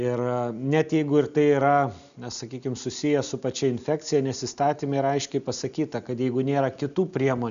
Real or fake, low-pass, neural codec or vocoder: real; 7.2 kHz; none